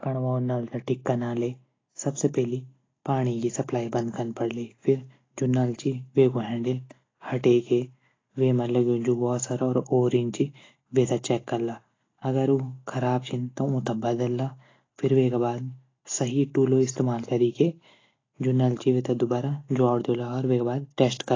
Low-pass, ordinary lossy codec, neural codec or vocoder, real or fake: 7.2 kHz; AAC, 32 kbps; none; real